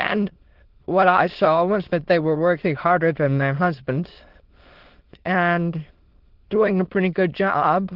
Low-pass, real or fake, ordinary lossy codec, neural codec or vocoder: 5.4 kHz; fake; Opus, 16 kbps; autoencoder, 22.05 kHz, a latent of 192 numbers a frame, VITS, trained on many speakers